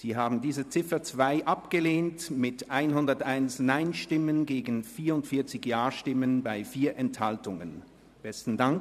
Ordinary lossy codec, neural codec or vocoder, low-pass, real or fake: none; vocoder, 44.1 kHz, 128 mel bands every 512 samples, BigVGAN v2; 14.4 kHz; fake